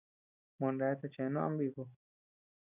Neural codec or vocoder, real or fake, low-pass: none; real; 3.6 kHz